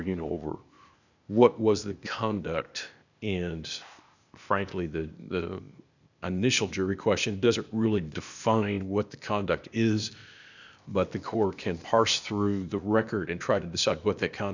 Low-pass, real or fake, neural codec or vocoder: 7.2 kHz; fake; codec, 16 kHz, 0.8 kbps, ZipCodec